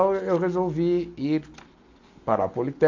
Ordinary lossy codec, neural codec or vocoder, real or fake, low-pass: AAC, 48 kbps; none; real; 7.2 kHz